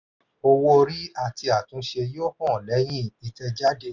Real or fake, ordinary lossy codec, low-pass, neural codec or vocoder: real; none; 7.2 kHz; none